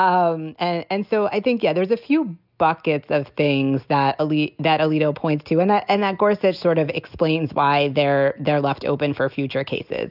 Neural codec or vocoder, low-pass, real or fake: none; 5.4 kHz; real